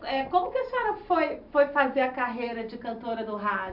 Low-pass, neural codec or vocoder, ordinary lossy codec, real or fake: 5.4 kHz; none; none; real